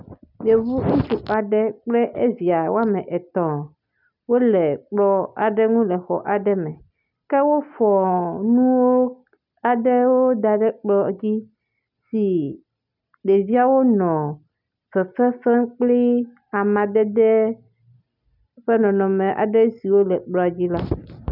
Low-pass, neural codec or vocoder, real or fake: 5.4 kHz; none; real